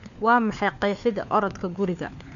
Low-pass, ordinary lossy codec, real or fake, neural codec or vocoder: 7.2 kHz; none; fake; codec, 16 kHz, 4 kbps, FunCodec, trained on LibriTTS, 50 frames a second